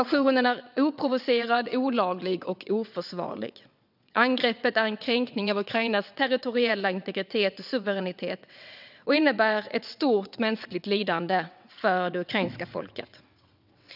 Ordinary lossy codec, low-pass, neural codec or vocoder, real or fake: none; 5.4 kHz; vocoder, 22.05 kHz, 80 mel bands, WaveNeXt; fake